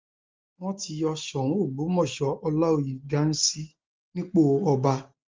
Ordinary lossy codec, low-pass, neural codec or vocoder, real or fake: Opus, 32 kbps; 7.2 kHz; none; real